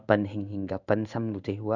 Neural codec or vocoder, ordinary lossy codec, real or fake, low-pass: codec, 16 kHz in and 24 kHz out, 1 kbps, XY-Tokenizer; none; fake; 7.2 kHz